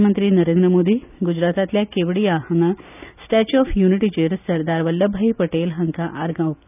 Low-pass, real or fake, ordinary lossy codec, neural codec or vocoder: 3.6 kHz; real; none; none